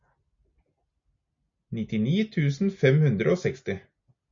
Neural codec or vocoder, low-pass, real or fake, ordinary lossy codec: none; 7.2 kHz; real; MP3, 48 kbps